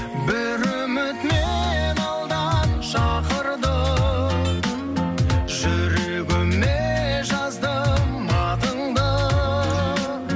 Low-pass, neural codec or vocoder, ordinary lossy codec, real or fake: none; none; none; real